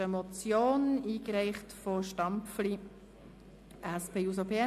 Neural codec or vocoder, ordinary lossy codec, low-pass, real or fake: none; MP3, 64 kbps; 14.4 kHz; real